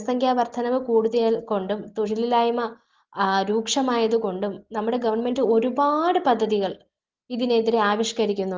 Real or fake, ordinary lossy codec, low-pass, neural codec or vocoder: real; Opus, 16 kbps; 7.2 kHz; none